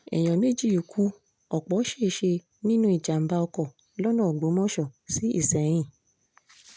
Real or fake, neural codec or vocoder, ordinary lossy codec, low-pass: real; none; none; none